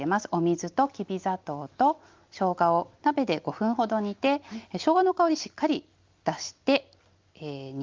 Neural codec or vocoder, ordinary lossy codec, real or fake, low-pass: none; Opus, 24 kbps; real; 7.2 kHz